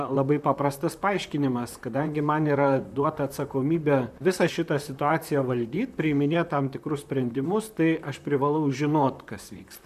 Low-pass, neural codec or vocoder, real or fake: 14.4 kHz; vocoder, 44.1 kHz, 128 mel bands, Pupu-Vocoder; fake